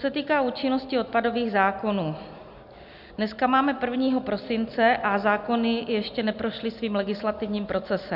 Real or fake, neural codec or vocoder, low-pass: real; none; 5.4 kHz